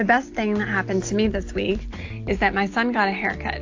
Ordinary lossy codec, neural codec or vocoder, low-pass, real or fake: AAC, 48 kbps; none; 7.2 kHz; real